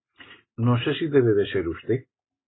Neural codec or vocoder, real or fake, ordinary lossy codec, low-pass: none; real; AAC, 16 kbps; 7.2 kHz